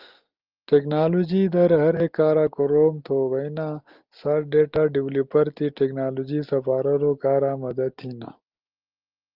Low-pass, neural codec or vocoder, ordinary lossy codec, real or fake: 5.4 kHz; none; Opus, 32 kbps; real